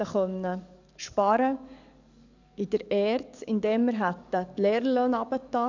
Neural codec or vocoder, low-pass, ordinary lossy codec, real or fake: codec, 44.1 kHz, 7.8 kbps, DAC; 7.2 kHz; none; fake